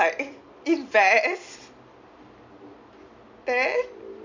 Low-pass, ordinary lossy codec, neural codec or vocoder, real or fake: 7.2 kHz; MP3, 64 kbps; none; real